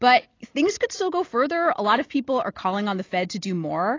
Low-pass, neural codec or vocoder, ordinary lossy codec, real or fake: 7.2 kHz; none; AAC, 32 kbps; real